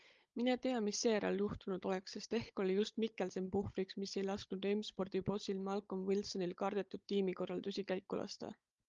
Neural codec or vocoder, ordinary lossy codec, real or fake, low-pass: codec, 16 kHz, 16 kbps, FunCodec, trained on Chinese and English, 50 frames a second; Opus, 32 kbps; fake; 7.2 kHz